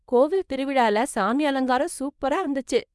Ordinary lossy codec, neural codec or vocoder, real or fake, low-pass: none; codec, 24 kHz, 0.9 kbps, WavTokenizer, small release; fake; none